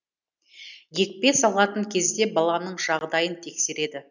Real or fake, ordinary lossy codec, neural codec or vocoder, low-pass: real; none; none; 7.2 kHz